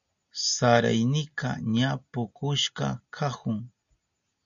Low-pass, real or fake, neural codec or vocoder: 7.2 kHz; real; none